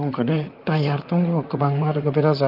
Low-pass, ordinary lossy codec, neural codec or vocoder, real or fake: 5.4 kHz; Opus, 32 kbps; vocoder, 44.1 kHz, 128 mel bands, Pupu-Vocoder; fake